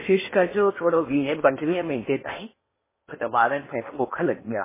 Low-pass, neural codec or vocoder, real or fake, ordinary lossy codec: 3.6 kHz; codec, 16 kHz in and 24 kHz out, 0.8 kbps, FocalCodec, streaming, 65536 codes; fake; MP3, 16 kbps